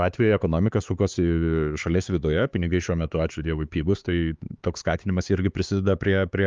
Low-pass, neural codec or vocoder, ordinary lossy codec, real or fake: 7.2 kHz; codec, 16 kHz, 4 kbps, X-Codec, HuBERT features, trained on LibriSpeech; Opus, 32 kbps; fake